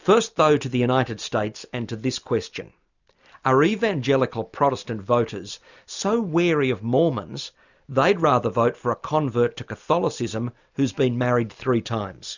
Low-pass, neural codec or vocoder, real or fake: 7.2 kHz; none; real